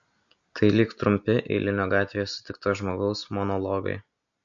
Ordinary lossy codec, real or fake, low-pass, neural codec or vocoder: MP3, 48 kbps; real; 7.2 kHz; none